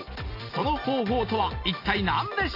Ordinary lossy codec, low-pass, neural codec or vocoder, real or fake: AAC, 32 kbps; 5.4 kHz; none; real